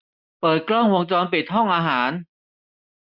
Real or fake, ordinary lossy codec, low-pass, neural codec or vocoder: real; MP3, 48 kbps; 5.4 kHz; none